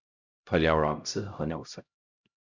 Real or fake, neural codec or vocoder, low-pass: fake; codec, 16 kHz, 0.5 kbps, X-Codec, HuBERT features, trained on LibriSpeech; 7.2 kHz